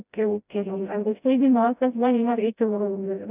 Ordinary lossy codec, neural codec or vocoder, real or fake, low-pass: none; codec, 16 kHz, 0.5 kbps, FreqCodec, smaller model; fake; 3.6 kHz